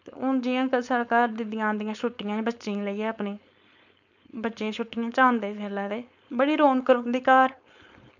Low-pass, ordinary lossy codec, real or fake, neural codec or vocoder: 7.2 kHz; none; fake; codec, 16 kHz, 4.8 kbps, FACodec